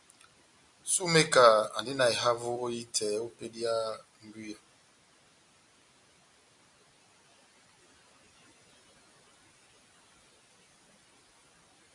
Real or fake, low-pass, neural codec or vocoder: real; 10.8 kHz; none